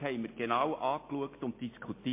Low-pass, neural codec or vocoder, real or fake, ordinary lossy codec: 3.6 kHz; none; real; MP3, 24 kbps